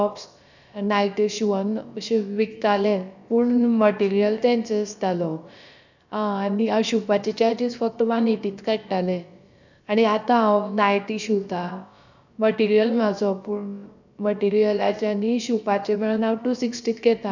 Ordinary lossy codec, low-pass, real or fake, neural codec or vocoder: none; 7.2 kHz; fake; codec, 16 kHz, about 1 kbps, DyCAST, with the encoder's durations